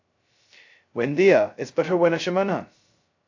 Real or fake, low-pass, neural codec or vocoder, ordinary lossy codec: fake; 7.2 kHz; codec, 16 kHz, 0.2 kbps, FocalCodec; AAC, 48 kbps